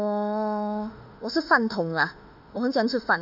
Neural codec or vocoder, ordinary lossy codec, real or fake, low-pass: autoencoder, 48 kHz, 32 numbers a frame, DAC-VAE, trained on Japanese speech; none; fake; 5.4 kHz